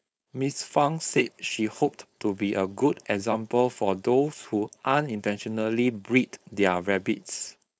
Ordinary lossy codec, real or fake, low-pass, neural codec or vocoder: none; fake; none; codec, 16 kHz, 4.8 kbps, FACodec